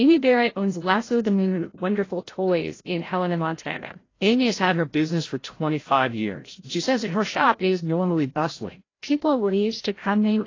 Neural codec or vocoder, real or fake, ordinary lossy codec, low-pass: codec, 16 kHz, 0.5 kbps, FreqCodec, larger model; fake; AAC, 32 kbps; 7.2 kHz